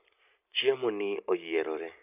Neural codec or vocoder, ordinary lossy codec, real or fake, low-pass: none; none; real; 3.6 kHz